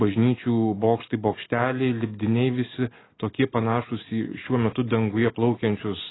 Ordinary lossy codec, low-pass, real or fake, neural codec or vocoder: AAC, 16 kbps; 7.2 kHz; real; none